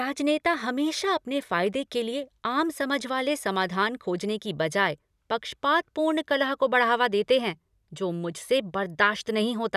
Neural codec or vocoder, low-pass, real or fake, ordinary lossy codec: none; 14.4 kHz; real; none